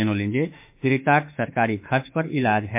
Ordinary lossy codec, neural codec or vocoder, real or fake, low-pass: MP3, 32 kbps; autoencoder, 48 kHz, 32 numbers a frame, DAC-VAE, trained on Japanese speech; fake; 3.6 kHz